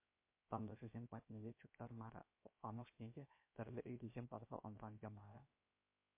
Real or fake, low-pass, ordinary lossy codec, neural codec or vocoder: fake; 3.6 kHz; MP3, 24 kbps; codec, 16 kHz, 0.7 kbps, FocalCodec